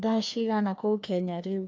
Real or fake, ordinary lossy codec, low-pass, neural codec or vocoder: fake; none; none; codec, 16 kHz, 2 kbps, FreqCodec, larger model